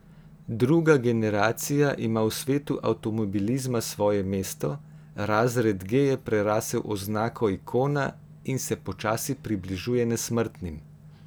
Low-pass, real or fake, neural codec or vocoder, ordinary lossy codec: none; real; none; none